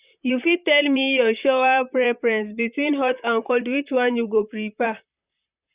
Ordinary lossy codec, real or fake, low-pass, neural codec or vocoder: Opus, 64 kbps; fake; 3.6 kHz; vocoder, 44.1 kHz, 128 mel bands, Pupu-Vocoder